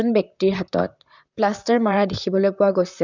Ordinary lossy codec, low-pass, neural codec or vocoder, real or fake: none; 7.2 kHz; vocoder, 44.1 kHz, 128 mel bands, Pupu-Vocoder; fake